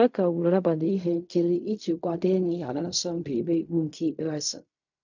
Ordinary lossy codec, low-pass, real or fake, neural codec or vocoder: none; 7.2 kHz; fake; codec, 16 kHz in and 24 kHz out, 0.4 kbps, LongCat-Audio-Codec, fine tuned four codebook decoder